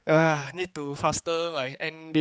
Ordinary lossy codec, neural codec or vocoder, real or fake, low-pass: none; codec, 16 kHz, 4 kbps, X-Codec, HuBERT features, trained on general audio; fake; none